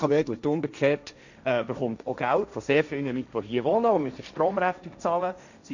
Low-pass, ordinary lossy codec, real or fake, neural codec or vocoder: 7.2 kHz; none; fake; codec, 16 kHz, 1.1 kbps, Voila-Tokenizer